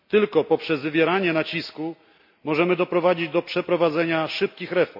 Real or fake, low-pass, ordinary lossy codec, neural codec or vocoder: real; 5.4 kHz; MP3, 48 kbps; none